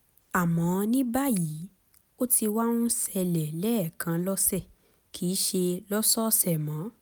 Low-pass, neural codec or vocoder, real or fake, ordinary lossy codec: none; none; real; none